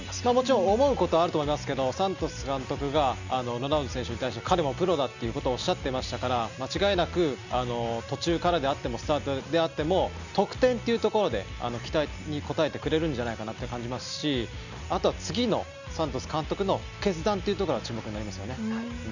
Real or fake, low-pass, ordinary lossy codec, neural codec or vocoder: real; 7.2 kHz; none; none